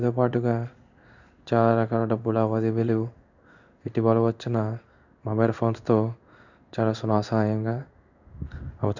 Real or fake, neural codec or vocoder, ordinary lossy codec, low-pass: fake; codec, 16 kHz in and 24 kHz out, 1 kbps, XY-Tokenizer; none; 7.2 kHz